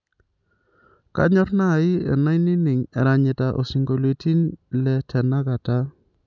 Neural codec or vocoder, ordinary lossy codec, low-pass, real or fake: none; none; 7.2 kHz; real